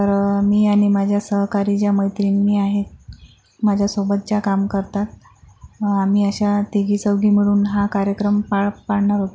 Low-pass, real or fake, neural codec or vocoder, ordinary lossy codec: none; real; none; none